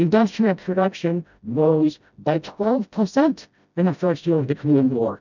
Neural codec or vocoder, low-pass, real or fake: codec, 16 kHz, 0.5 kbps, FreqCodec, smaller model; 7.2 kHz; fake